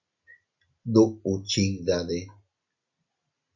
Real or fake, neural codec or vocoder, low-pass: real; none; 7.2 kHz